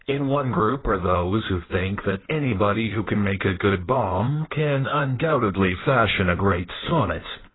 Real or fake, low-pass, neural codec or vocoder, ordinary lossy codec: fake; 7.2 kHz; codec, 16 kHz in and 24 kHz out, 1.1 kbps, FireRedTTS-2 codec; AAC, 16 kbps